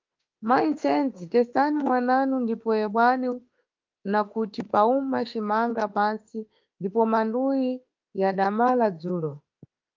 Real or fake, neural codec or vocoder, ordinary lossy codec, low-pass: fake; autoencoder, 48 kHz, 32 numbers a frame, DAC-VAE, trained on Japanese speech; Opus, 24 kbps; 7.2 kHz